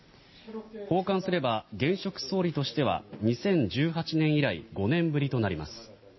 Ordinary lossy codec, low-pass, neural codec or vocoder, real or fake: MP3, 24 kbps; 7.2 kHz; none; real